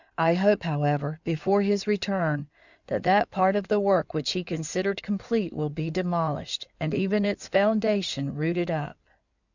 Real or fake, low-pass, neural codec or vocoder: fake; 7.2 kHz; codec, 16 kHz in and 24 kHz out, 2.2 kbps, FireRedTTS-2 codec